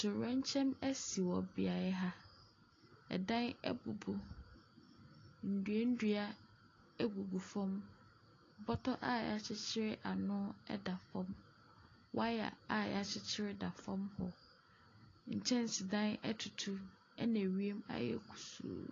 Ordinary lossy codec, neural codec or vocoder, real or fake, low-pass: AAC, 32 kbps; none; real; 7.2 kHz